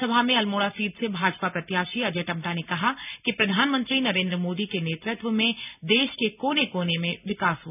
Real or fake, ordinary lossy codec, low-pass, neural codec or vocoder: real; none; 3.6 kHz; none